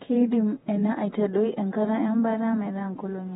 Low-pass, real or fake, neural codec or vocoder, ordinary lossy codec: 19.8 kHz; fake; vocoder, 48 kHz, 128 mel bands, Vocos; AAC, 16 kbps